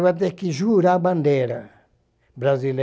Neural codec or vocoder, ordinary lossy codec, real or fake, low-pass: none; none; real; none